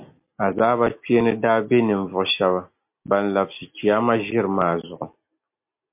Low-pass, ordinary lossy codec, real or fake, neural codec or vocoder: 3.6 kHz; MP3, 32 kbps; real; none